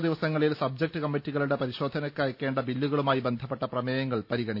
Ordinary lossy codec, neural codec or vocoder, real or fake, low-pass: none; none; real; 5.4 kHz